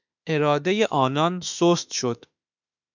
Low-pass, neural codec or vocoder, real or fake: 7.2 kHz; autoencoder, 48 kHz, 32 numbers a frame, DAC-VAE, trained on Japanese speech; fake